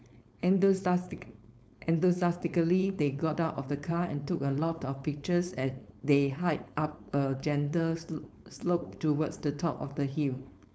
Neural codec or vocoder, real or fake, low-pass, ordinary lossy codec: codec, 16 kHz, 4.8 kbps, FACodec; fake; none; none